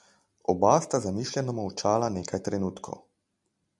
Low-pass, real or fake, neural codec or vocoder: 10.8 kHz; real; none